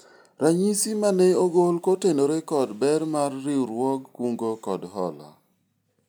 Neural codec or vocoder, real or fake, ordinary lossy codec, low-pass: none; real; none; none